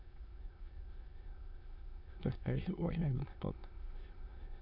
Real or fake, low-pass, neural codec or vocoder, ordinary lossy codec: fake; 5.4 kHz; autoencoder, 22.05 kHz, a latent of 192 numbers a frame, VITS, trained on many speakers; Opus, 64 kbps